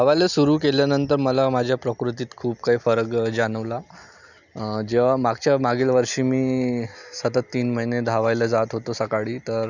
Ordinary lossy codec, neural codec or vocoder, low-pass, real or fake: none; none; 7.2 kHz; real